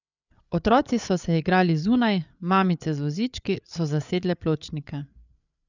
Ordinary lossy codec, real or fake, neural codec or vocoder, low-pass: none; fake; codec, 16 kHz, 8 kbps, FreqCodec, larger model; 7.2 kHz